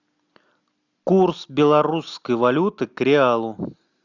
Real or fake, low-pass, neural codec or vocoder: real; 7.2 kHz; none